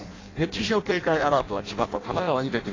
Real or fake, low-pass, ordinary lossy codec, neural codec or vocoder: fake; 7.2 kHz; AAC, 32 kbps; codec, 16 kHz in and 24 kHz out, 0.6 kbps, FireRedTTS-2 codec